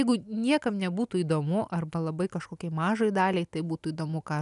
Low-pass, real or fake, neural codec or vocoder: 10.8 kHz; real; none